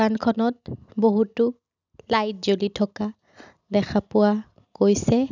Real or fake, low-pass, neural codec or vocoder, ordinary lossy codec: real; 7.2 kHz; none; none